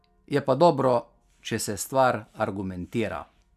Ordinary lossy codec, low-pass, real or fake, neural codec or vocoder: none; 14.4 kHz; real; none